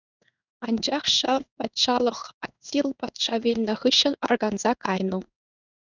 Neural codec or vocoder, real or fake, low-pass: codec, 16 kHz in and 24 kHz out, 1 kbps, XY-Tokenizer; fake; 7.2 kHz